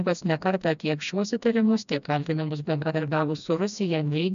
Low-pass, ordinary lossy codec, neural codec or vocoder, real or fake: 7.2 kHz; MP3, 96 kbps; codec, 16 kHz, 1 kbps, FreqCodec, smaller model; fake